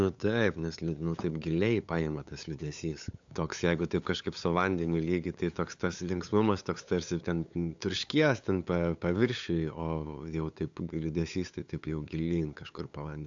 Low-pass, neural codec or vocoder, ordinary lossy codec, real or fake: 7.2 kHz; codec, 16 kHz, 8 kbps, FunCodec, trained on LibriTTS, 25 frames a second; AAC, 64 kbps; fake